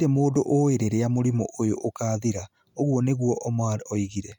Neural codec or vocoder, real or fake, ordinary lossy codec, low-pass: none; real; none; 19.8 kHz